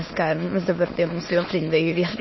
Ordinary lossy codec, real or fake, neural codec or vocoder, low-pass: MP3, 24 kbps; fake; autoencoder, 22.05 kHz, a latent of 192 numbers a frame, VITS, trained on many speakers; 7.2 kHz